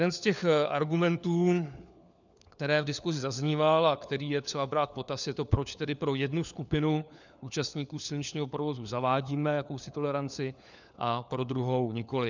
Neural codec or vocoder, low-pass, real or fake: codec, 16 kHz, 4 kbps, FunCodec, trained on LibriTTS, 50 frames a second; 7.2 kHz; fake